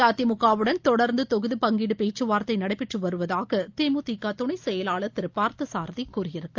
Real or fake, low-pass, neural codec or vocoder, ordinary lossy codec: real; 7.2 kHz; none; Opus, 24 kbps